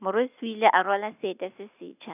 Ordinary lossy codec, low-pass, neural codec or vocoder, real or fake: none; 3.6 kHz; none; real